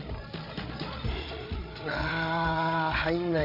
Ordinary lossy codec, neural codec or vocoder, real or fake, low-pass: none; codec, 16 kHz, 8 kbps, FreqCodec, larger model; fake; 5.4 kHz